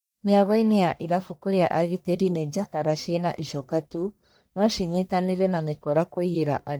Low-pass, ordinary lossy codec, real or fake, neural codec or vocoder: none; none; fake; codec, 44.1 kHz, 1.7 kbps, Pupu-Codec